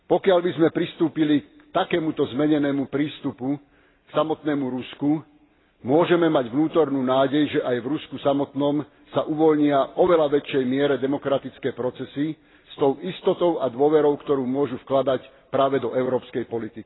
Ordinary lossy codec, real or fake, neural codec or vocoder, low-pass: AAC, 16 kbps; real; none; 7.2 kHz